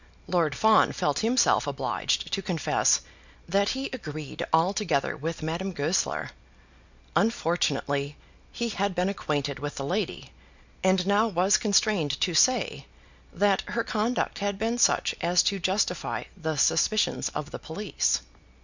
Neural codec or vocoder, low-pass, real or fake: none; 7.2 kHz; real